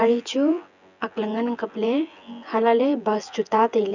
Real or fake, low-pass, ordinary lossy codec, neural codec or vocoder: fake; 7.2 kHz; none; vocoder, 24 kHz, 100 mel bands, Vocos